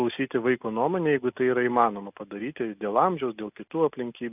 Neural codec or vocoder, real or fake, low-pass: none; real; 3.6 kHz